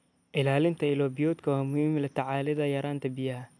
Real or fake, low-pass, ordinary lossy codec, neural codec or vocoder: real; none; none; none